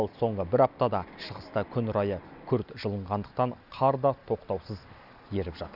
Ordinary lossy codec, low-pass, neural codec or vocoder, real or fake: none; 5.4 kHz; none; real